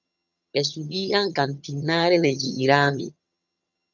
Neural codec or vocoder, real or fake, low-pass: vocoder, 22.05 kHz, 80 mel bands, HiFi-GAN; fake; 7.2 kHz